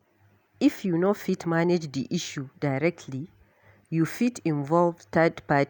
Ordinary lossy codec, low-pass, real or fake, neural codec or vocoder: none; none; real; none